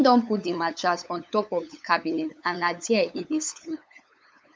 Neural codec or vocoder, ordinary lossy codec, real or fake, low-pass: codec, 16 kHz, 8 kbps, FunCodec, trained on LibriTTS, 25 frames a second; none; fake; none